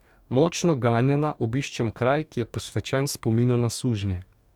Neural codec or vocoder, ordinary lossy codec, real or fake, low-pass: codec, 44.1 kHz, 2.6 kbps, DAC; none; fake; 19.8 kHz